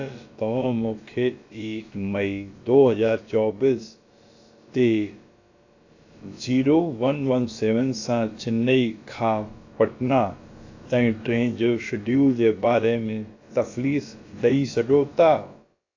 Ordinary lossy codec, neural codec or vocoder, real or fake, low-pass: AAC, 48 kbps; codec, 16 kHz, about 1 kbps, DyCAST, with the encoder's durations; fake; 7.2 kHz